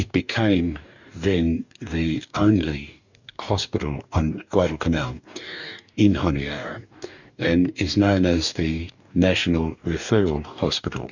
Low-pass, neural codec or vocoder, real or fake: 7.2 kHz; codec, 44.1 kHz, 2.6 kbps, DAC; fake